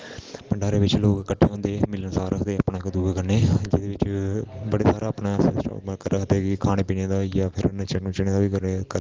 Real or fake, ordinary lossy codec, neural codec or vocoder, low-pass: real; Opus, 16 kbps; none; 7.2 kHz